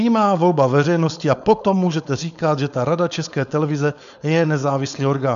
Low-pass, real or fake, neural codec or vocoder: 7.2 kHz; fake; codec, 16 kHz, 4.8 kbps, FACodec